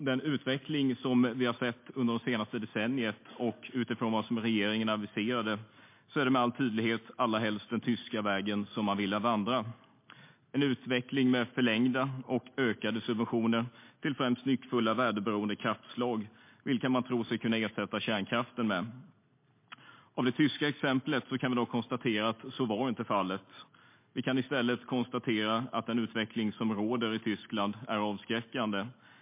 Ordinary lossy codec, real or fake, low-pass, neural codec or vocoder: MP3, 24 kbps; real; 3.6 kHz; none